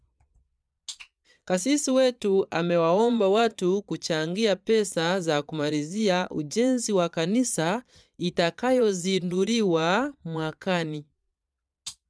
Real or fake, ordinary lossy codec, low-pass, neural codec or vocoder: fake; none; none; vocoder, 22.05 kHz, 80 mel bands, Vocos